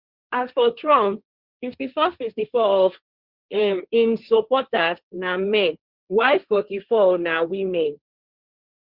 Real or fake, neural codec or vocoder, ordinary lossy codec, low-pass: fake; codec, 16 kHz, 1.1 kbps, Voila-Tokenizer; Opus, 64 kbps; 5.4 kHz